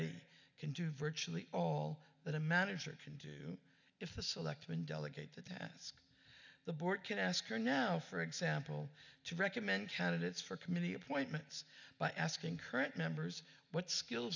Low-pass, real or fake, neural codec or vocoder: 7.2 kHz; real; none